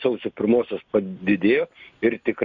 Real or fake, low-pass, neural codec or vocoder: real; 7.2 kHz; none